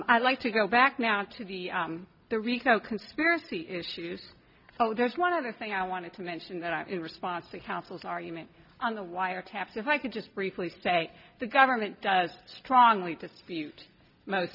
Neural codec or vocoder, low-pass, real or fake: none; 5.4 kHz; real